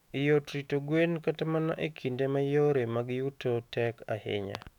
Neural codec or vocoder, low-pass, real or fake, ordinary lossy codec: autoencoder, 48 kHz, 128 numbers a frame, DAC-VAE, trained on Japanese speech; 19.8 kHz; fake; none